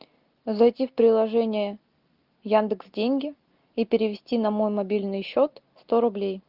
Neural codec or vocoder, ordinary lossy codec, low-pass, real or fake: none; Opus, 32 kbps; 5.4 kHz; real